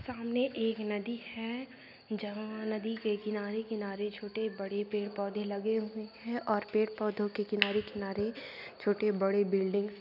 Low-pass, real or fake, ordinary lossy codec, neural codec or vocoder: 5.4 kHz; real; none; none